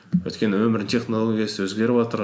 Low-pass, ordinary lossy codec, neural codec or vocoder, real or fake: none; none; none; real